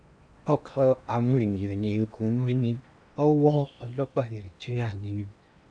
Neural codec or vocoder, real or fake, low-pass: codec, 16 kHz in and 24 kHz out, 0.8 kbps, FocalCodec, streaming, 65536 codes; fake; 9.9 kHz